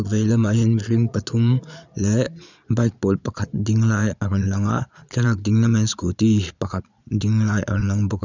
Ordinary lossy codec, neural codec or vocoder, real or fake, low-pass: none; vocoder, 22.05 kHz, 80 mel bands, Vocos; fake; 7.2 kHz